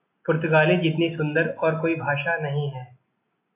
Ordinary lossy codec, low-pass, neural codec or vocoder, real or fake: MP3, 32 kbps; 3.6 kHz; none; real